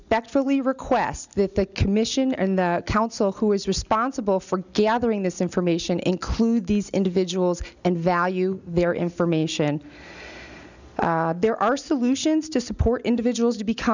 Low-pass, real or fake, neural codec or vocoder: 7.2 kHz; real; none